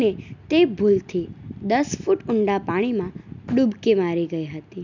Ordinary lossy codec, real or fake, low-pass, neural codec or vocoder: none; real; 7.2 kHz; none